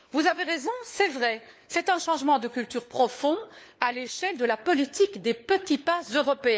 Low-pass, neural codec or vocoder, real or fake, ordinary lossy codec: none; codec, 16 kHz, 4 kbps, FunCodec, trained on LibriTTS, 50 frames a second; fake; none